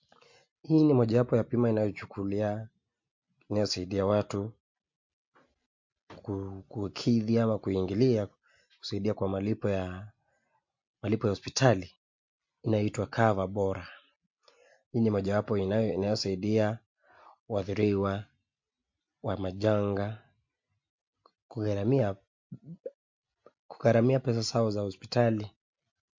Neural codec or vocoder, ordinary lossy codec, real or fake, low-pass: none; MP3, 48 kbps; real; 7.2 kHz